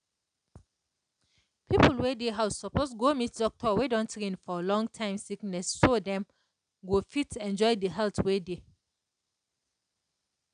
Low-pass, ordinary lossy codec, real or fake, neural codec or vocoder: 9.9 kHz; none; real; none